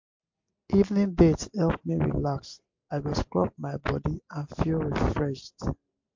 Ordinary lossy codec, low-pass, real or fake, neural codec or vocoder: MP3, 48 kbps; 7.2 kHz; real; none